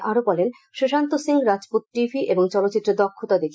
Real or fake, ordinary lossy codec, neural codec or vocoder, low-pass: real; none; none; none